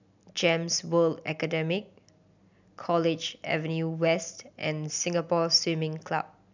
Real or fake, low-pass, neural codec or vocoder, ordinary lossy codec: real; 7.2 kHz; none; none